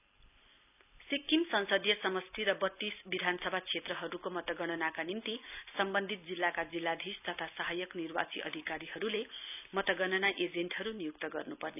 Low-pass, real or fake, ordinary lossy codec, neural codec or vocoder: 3.6 kHz; real; none; none